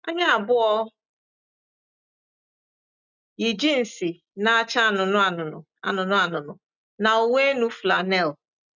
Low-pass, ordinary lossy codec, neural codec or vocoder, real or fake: 7.2 kHz; none; none; real